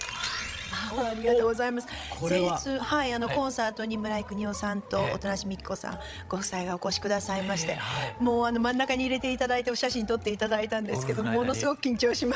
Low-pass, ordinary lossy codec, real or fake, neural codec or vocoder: none; none; fake; codec, 16 kHz, 16 kbps, FreqCodec, larger model